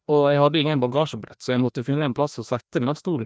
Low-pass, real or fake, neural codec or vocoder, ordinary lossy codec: none; fake; codec, 16 kHz, 1 kbps, FreqCodec, larger model; none